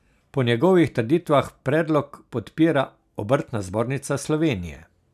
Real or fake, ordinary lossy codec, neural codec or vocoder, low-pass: real; none; none; 14.4 kHz